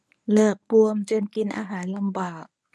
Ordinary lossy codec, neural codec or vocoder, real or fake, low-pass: none; codec, 24 kHz, 0.9 kbps, WavTokenizer, medium speech release version 1; fake; none